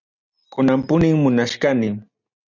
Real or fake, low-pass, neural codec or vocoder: real; 7.2 kHz; none